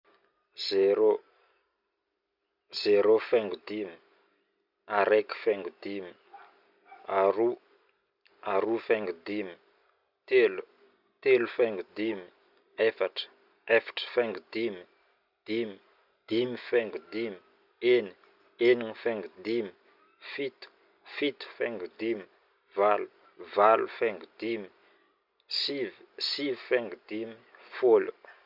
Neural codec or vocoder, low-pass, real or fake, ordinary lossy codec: none; 5.4 kHz; real; none